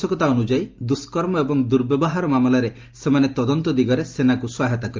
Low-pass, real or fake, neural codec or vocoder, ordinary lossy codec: 7.2 kHz; real; none; Opus, 24 kbps